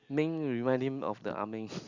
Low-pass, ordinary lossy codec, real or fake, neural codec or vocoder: 7.2 kHz; Opus, 64 kbps; real; none